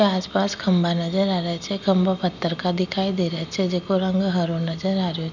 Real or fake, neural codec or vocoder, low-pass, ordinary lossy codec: real; none; 7.2 kHz; none